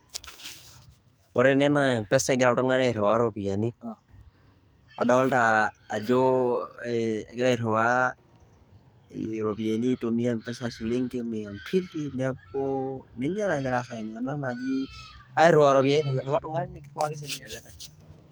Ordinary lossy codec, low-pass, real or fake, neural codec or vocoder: none; none; fake; codec, 44.1 kHz, 2.6 kbps, SNAC